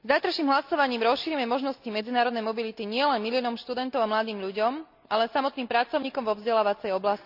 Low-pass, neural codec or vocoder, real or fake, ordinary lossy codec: 5.4 kHz; none; real; none